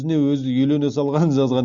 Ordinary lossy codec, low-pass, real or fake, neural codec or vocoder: Opus, 64 kbps; 7.2 kHz; real; none